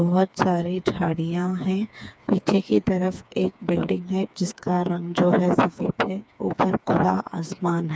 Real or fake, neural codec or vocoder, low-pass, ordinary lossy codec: fake; codec, 16 kHz, 4 kbps, FreqCodec, smaller model; none; none